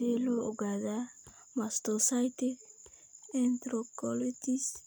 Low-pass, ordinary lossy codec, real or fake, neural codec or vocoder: none; none; real; none